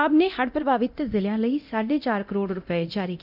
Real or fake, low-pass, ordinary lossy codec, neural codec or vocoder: fake; 5.4 kHz; none; codec, 24 kHz, 0.9 kbps, DualCodec